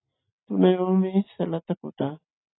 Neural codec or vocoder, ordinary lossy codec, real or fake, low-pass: none; AAC, 16 kbps; real; 7.2 kHz